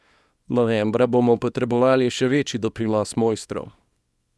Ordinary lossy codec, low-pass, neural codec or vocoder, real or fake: none; none; codec, 24 kHz, 0.9 kbps, WavTokenizer, small release; fake